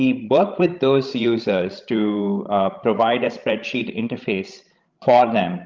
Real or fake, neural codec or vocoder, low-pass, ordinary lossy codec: fake; codec, 16 kHz, 16 kbps, FreqCodec, larger model; 7.2 kHz; Opus, 32 kbps